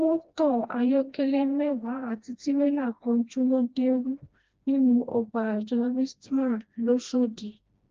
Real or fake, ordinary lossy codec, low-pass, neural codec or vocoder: fake; Opus, 24 kbps; 7.2 kHz; codec, 16 kHz, 1 kbps, FreqCodec, smaller model